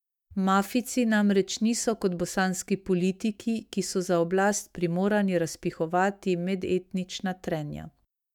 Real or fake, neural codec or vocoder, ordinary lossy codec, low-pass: fake; autoencoder, 48 kHz, 128 numbers a frame, DAC-VAE, trained on Japanese speech; none; 19.8 kHz